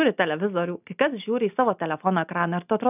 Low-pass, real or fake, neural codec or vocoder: 3.6 kHz; real; none